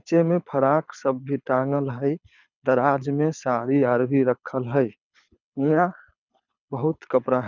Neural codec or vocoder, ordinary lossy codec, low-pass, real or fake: codec, 24 kHz, 6 kbps, HILCodec; none; 7.2 kHz; fake